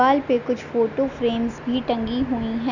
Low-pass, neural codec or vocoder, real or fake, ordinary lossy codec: 7.2 kHz; none; real; none